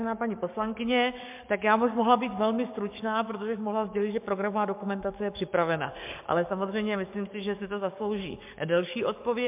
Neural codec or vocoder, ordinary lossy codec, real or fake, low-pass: codec, 44.1 kHz, 7.8 kbps, DAC; MP3, 32 kbps; fake; 3.6 kHz